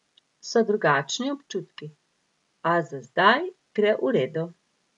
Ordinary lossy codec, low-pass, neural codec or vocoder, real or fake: none; 10.8 kHz; none; real